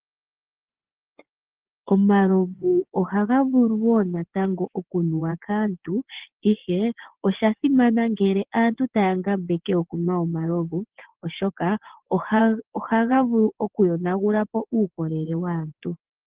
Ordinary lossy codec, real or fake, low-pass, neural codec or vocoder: Opus, 16 kbps; fake; 3.6 kHz; vocoder, 24 kHz, 100 mel bands, Vocos